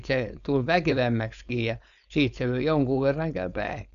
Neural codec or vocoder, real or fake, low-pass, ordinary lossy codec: codec, 16 kHz, 4.8 kbps, FACodec; fake; 7.2 kHz; none